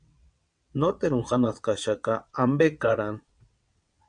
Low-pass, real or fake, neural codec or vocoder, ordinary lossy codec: 9.9 kHz; fake; vocoder, 22.05 kHz, 80 mel bands, WaveNeXt; MP3, 96 kbps